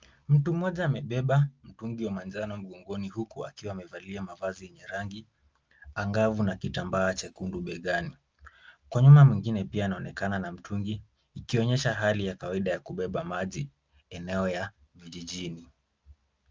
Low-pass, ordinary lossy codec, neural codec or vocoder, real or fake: 7.2 kHz; Opus, 24 kbps; none; real